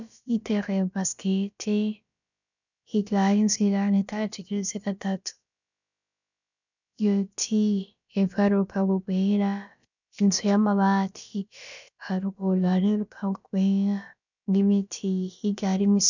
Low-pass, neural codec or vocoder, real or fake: 7.2 kHz; codec, 16 kHz, about 1 kbps, DyCAST, with the encoder's durations; fake